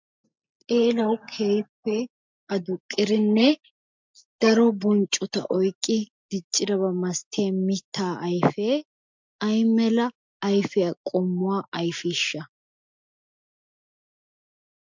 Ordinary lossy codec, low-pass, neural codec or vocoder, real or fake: MP3, 64 kbps; 7.2 kHz; none; real